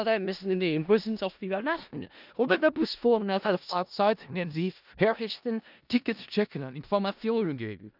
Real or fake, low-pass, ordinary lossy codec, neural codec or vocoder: fake; 5.4 kHz; none; codec, 16 kHz in and 24 kHz out, 0.4 kbps, LongCat-Audio-Codec, four codebook decoder